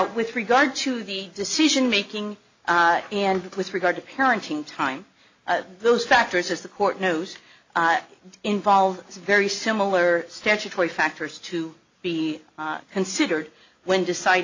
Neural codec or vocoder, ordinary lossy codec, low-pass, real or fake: none; AAC, 48 kbps; 7.2 kHz; real